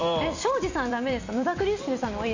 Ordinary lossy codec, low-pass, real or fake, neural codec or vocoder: AAC, 48 kbps; 7.2 kHz; fake; vocoder, 44.1 kHz, 128 mel bands every 256 samples, BigVGAN v2